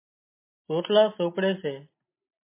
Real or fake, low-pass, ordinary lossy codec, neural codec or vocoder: real; 3.6 kHz; MP3, 24 kbps; none